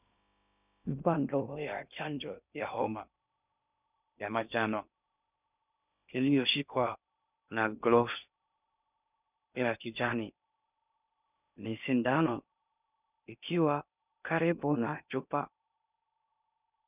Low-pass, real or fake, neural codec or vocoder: 3.6 kHz; fake; codec, 16 kHz in and 24 kHz out, 0.6 kbps, FocalCodec, streaming, 2048 codes